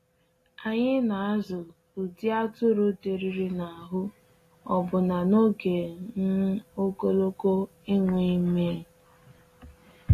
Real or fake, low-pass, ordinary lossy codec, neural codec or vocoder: real; 14.4 kHz; AAC, 48 kbps; none